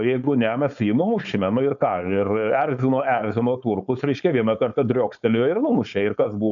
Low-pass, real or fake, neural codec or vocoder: 7.2 kHz; fake; codec, 16 kHz, 4.8 kbps, FACodec